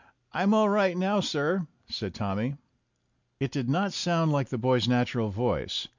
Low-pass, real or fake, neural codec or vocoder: 7.2 kHz; real; none